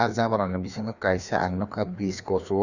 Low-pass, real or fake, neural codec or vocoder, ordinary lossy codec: 7.2 kHz; fake; codec, 16 kHz, 2 kbps, FreqCodec, larger model; none